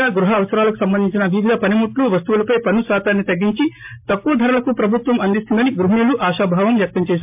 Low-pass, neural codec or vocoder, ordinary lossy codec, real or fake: 3.6 kHz; none; MP3, 32 kbps; real